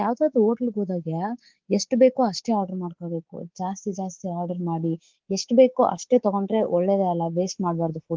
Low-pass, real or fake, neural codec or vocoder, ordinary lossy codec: 7.2 kHz; fake; codec, 24 kHz, 3.1 kbps, DualCodec; Opus, 16 kbps